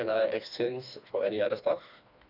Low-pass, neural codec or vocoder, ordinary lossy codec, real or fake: 5.4 kHz; codec, 16 kHz, 2 kbps, FreqCodec, smaller model; none; fake